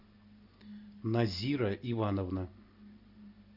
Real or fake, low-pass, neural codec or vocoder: real; 5.4 kHz; none